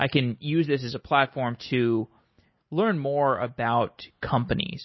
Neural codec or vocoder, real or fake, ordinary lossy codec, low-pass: none; real; MP3, 24 kbps; 7.2 kHz